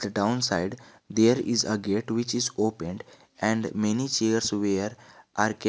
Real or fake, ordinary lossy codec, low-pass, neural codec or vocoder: real; none; none; none